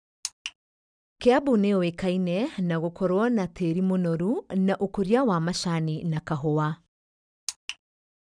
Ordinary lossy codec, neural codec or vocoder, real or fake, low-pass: none; none; real; 9.9 kHz